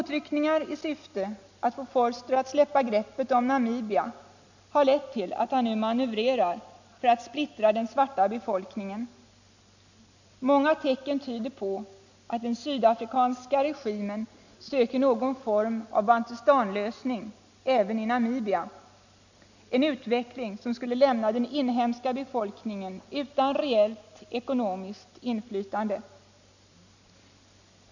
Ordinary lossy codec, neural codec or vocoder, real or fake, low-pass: none; none; real; 7.2 kHz